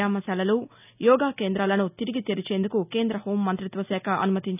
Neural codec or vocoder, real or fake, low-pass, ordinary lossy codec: none; real; 3.6 kHz; none